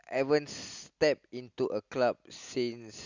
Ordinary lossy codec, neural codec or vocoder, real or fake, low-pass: Opus, 64 kbps; none; real; 7.2 kHz